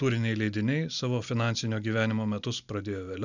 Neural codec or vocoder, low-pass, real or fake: none; 7.2 kHz; real